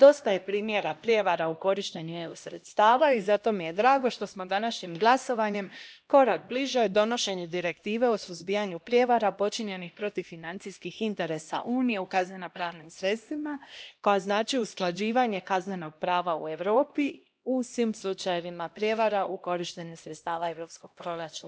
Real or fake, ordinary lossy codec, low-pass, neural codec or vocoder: fake; none; none; codec, 16 kHz, 1 kbps, X-Codec, HuBERT features, trained on LibriSpeech